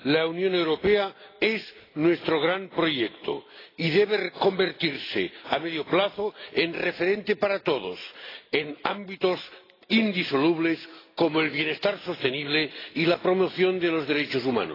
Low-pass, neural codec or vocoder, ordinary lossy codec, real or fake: 5.4 kHz; none; AAC, 24 kbps; real